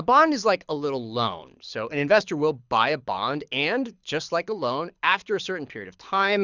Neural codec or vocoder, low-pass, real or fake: codec, 24 kHz, 6 kbps, HILCodec; 7.2 kHz; fake